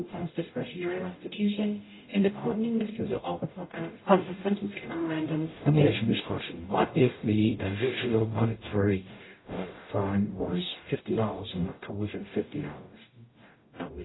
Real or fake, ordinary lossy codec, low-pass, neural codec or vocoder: fake; AAC, 16 kbps; 7.2 kHz; codec, 44.1 kHz, 0.9 kbps, DAC